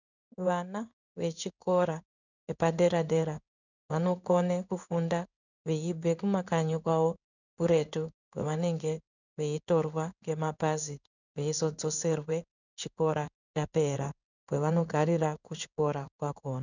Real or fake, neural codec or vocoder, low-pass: fake; codec, 16 kHz in and 24 kHz out, 1 kbps, XY-Tokenizer; 7.2 kHz